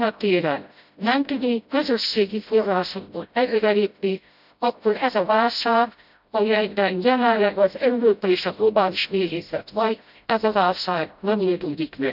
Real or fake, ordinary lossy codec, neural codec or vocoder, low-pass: fake; none; codec, 16 kHz, 0.5 kbps, FreqCodec, smaller model; 5.4 kHz